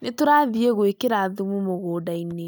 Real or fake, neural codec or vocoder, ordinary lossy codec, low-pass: real; none; none; none